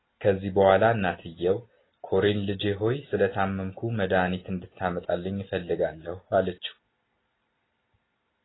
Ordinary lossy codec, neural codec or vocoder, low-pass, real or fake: AAC, 16 kbps; none; 7.2 kHz; real